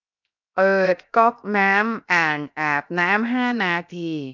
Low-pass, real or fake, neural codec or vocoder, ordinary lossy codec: 7.2 kHz; fake; codec, 16 kHz, 0.7 kbps, FocalCodec; none